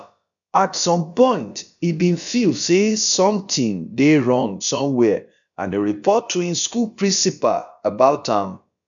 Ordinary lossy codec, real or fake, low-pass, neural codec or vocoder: none; fake; 7.2 kHz; codec, 16 kHz, about 1 kbps, DyCAST, with the encoder's durations